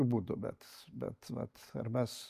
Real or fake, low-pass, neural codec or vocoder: real; 14.4 kHz; none